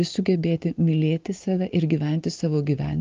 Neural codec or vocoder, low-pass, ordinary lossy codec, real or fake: none; 7.2 kHz; Opus, 32 kbps; real